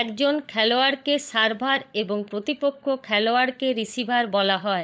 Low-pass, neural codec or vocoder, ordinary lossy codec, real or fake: none; codec, 16 kHz, 16 kbps, FunCodec, trained on LibriTTS, 50 frames a second; none; fake